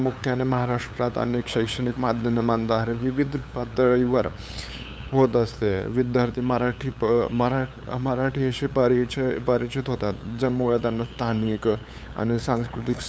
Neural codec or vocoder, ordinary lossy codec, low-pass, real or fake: codec, 16 kHz, 8 kbps, FunCodec, trained on LibriTTS, 25 frames a second; none; none; fake